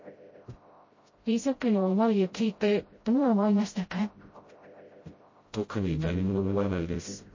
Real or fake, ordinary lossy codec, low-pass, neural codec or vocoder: fake; MP3, 32 kbps; 7.2 kHz; codec, 16 kHz, 0.5 kbps, FreqCodec, smaller model